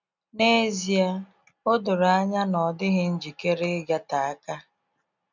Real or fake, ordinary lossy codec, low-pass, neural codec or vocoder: real; none; 7.2 kHz; none